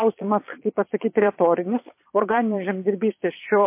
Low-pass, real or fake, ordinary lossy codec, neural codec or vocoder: 3.6 kHz; fake; MP3, 24 kbps; vocoder, 22.05 kHz, 80 mel bands, Vocos